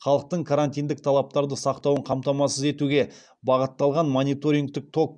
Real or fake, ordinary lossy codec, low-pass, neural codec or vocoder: real; none; 9.9 kHz; none